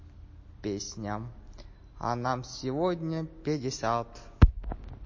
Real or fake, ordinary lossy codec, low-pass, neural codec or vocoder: real; MP3, 32 kbps; 7.2 kHz; none